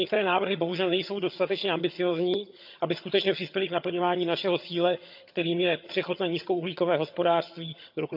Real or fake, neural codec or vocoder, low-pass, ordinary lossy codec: fake; vocoder, 22.05 kHz, 80 mel bands, HiFi-GAN; 5.4 kHz; none